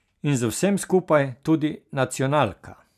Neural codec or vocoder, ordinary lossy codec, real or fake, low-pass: none; none; real; 14.4 kHz